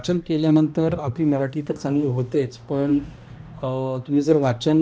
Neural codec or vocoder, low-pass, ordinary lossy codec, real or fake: codec, 16 kHz, 1 kbps, X-Codec, HuBERT features, trained on balanced general audio; none; none; fake